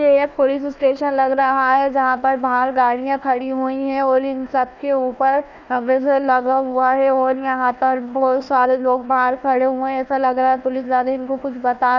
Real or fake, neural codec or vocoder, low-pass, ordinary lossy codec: fake; codec, 16 kHz, 1 kbps, FunCodec, trained on Chinese and English, 50 frames a second; 7.2 kHz; none